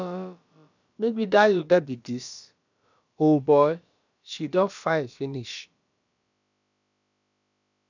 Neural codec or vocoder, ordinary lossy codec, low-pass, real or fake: codec, 16 kHz, about 1 kbps, DyCAST, with the encoder's durations; none; 7.2 kHz; fake